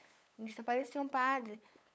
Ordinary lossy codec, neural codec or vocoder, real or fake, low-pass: none; codec, 16 kHz, 8 kbps, FunCodec, trained on LibriTTS, 25 frames a second; fake; none